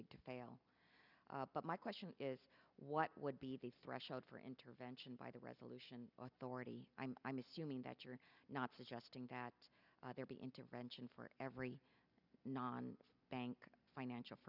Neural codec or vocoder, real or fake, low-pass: none; real; 5.4 kHz